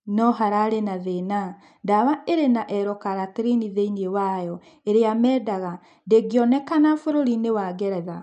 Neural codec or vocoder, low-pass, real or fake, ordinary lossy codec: none; 9.9 kHz; real; none